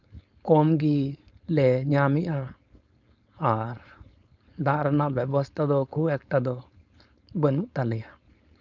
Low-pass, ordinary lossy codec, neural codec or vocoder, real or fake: 7.2 kHz; none; codec, 16 kHz, 4.8 kbps, FACodec; fake